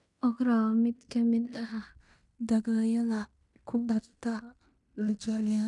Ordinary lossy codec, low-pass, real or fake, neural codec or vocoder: none; 10.8 kHz; fake; codec, 16 kHz in and 24 kHz out, 0.9 kbps, LongCat-Audio-Codec, fine tuned four codebook decoder